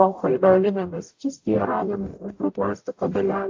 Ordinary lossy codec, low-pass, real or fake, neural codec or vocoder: AAC, 48 kbps; 7.2 kHz; fake; codec, 44.1 kHz, 0.9 kbps, DAC